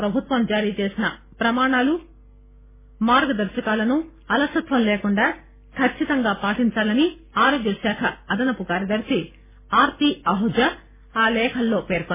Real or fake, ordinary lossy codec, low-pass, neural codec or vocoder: real; MP3, 16 kbps; 3.6 kHz; none